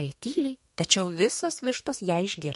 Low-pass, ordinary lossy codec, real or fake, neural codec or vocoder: 14.4 kHz; MP3, 48 kbps; fake; codec, 32 kHz, 1.9 kbps, SNAC